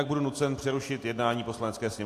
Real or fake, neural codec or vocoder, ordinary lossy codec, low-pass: real; none; AAC, 64 kbps; 14.4 kHz